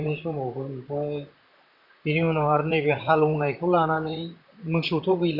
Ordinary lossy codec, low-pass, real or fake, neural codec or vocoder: none; 5.4 kHz; fake; vocoder, 44.1 kHz, 128 mel bands, Pupu-Vocoder